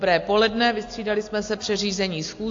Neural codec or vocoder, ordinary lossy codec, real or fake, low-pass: none; AAC, 48 kbps; real; 7.2 kHz